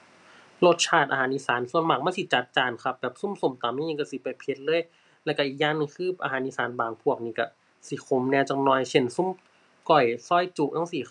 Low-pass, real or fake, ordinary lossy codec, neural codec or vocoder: 10.8 kHz; real; none; none